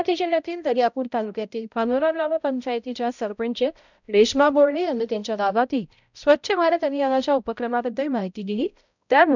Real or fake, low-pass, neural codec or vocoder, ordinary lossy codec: fake; 7.2 kHz; codec, 16 kHz, 0.5 kbps, X-Codec, HuBERT features, trained on balanced general audio; none